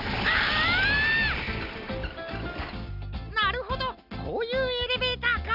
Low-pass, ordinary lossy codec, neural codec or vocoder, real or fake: 5.4 kHz; none; none; real